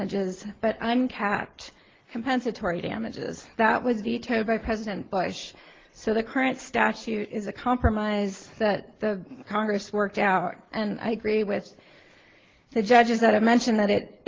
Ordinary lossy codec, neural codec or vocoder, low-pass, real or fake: Opus, 16 kbps; none; 7.2 kHz; real